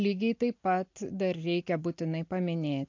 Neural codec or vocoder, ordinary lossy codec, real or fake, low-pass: none; MP3, 48 kbps; real; 7.2 kHz